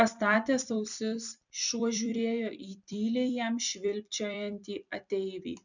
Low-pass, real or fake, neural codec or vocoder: 7.2 kHz; fake; vocoder, 44.1 kHz, 128 mel bands every 256 samples, BigVGAN v2